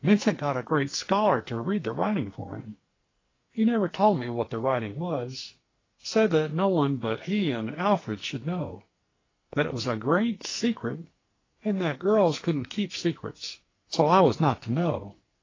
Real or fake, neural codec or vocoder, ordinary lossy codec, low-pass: fake; codec, 44.1 kHz, 2.6 kbps, SNAC; AAC, 32 kbps; 7.2 kHz